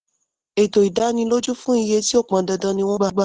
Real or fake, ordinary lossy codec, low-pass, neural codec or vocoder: real; Opus, 16 kbps; 9.9 kHz; none